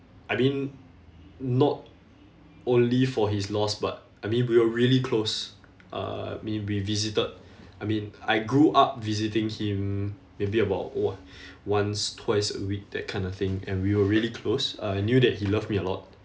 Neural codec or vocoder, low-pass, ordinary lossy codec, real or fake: none; none; none; real